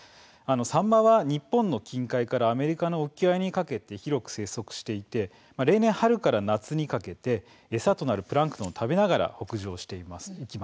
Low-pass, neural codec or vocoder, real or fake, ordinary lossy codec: none; none; real; none